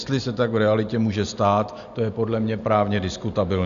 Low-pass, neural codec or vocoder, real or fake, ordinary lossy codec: 7.2 kHz; none; real; AAC, 96 kbps